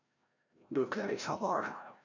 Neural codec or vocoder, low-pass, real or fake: codec, 16 kHz, 0.5 kbps, FreqCodec, larger model; 7.2 kHz; fake